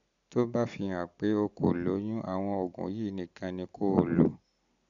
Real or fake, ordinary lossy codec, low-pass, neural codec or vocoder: real; none; 7.2 kHz; none